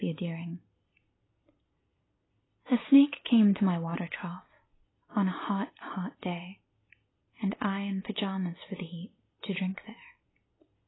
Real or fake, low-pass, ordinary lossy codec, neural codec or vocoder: real; 7.2 kHz; AAC, 16 kbps; none